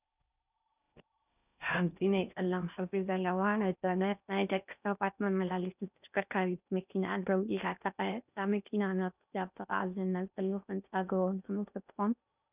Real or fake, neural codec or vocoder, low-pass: fake; codec, 16 kHz in and 24 kHz out, 0.6 kbps, FocalCodec, streaming, 4096 codes; 3.6 kHz